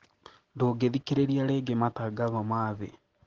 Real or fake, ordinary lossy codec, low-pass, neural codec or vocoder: real; Opus, 16 kbps; 7.2 kHz; none